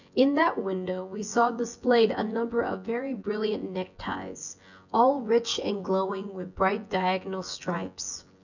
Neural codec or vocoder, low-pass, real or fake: vocoder, 24 kHz, 100 mel bands, Vocos; 7.2 kHz; fake